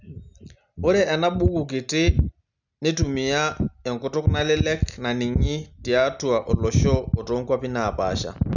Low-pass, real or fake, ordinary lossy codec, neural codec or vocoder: 7.2 kHz; real; none; none